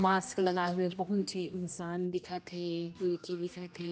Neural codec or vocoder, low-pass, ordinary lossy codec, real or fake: codec, 16 kHz, 1 kbps, X-Codec, HuBERT features, trained on general audio; none; none; fake